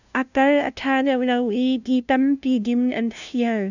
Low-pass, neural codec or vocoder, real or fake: 7.2 kHz; codec, 16 kHz, 0.5 kbps, FunCodec, trained on LibriTTS, 25 frames a second; fake